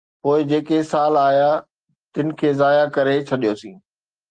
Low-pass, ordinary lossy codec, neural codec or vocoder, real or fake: 9.9 kHz; Opus, 24 kbps; none; real